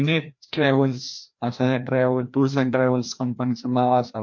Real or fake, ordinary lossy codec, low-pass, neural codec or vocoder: fake; MP3, 48 kbps; 7.2 kHz; codec, 16 kHz, 1 kbps, FreqCodec, larger model